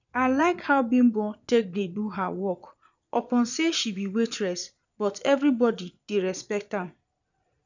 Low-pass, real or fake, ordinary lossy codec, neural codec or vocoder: 7.2 kHz; fake; none; vocoder, 22.05 kHz, 80 mel bands, Vocos